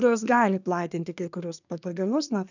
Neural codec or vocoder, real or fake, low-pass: codec, 24 kHz, 1 kbps, SNAC; fake; 7.2 kHz